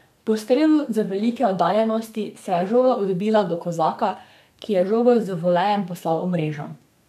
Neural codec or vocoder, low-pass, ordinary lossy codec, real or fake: codec, 32 kHz, 1.9 kbps, SNAC; 14.4 kHz; none; fake